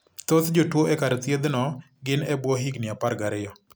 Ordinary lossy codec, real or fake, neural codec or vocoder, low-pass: none; real; none; none